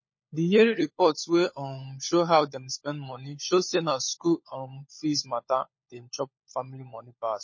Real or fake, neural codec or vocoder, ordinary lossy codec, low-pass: fake; codec, 16 kHz, 16 kbps, FunCodec, trained on LibriTTS, 50 frames a second; MP3, 32 kbps; 7.2 kHz